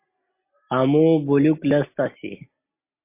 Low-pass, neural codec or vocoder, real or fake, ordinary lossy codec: 3.6 kHz; none; real; MP3, 24 kbps